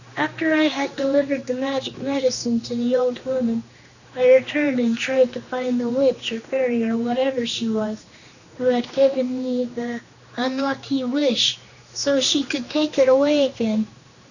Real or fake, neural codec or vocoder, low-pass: fake; codec, 16 kHz, 2 kbps, X-Codec, HuBERT features, trained on general audio; 7.2 kHz